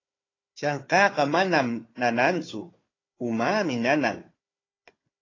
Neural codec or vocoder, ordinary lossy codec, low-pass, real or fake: codec, 16 kHz, 4 kbps, FunCodec, trained on Chinese and English, 50 frames a second; AAC, 32 kbps; 7.2 kHz; fake